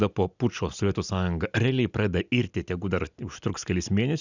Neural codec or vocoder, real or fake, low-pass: none; real; 7.2 kHz